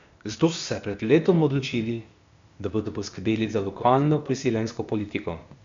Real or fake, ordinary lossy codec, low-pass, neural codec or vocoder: fake; MP3, 64 kbps; 7.2 kHz; codec, 16 kHz, 0.8 kbps, ZipCodec